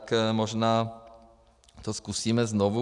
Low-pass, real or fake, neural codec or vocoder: 10.8 kHz; real; none